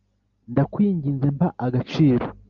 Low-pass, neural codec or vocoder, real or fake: 7.2 kHz; none; real